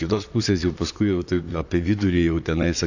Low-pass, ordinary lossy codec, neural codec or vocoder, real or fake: 7.2 kHz; AAC, 48 kbps; vocoder, 44.1 kHz, 128 mel bands, Pupu-Vocoder; fake